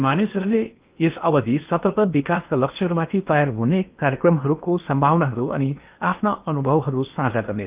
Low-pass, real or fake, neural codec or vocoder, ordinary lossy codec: 3.6 kHz; fake; codec, 16 kHz in and 24 kHz out, 0.8 kbps, FocalCodec, streaming, 65536 codes; Opus, 24 kbps